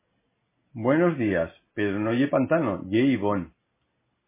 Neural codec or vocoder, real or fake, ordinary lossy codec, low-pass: none; real; MP3, 16 kbps; 3.6 kHz